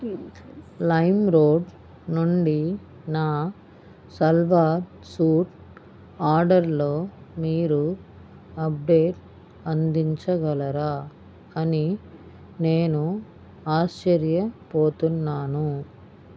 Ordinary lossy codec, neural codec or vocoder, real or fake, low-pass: none; none; real; none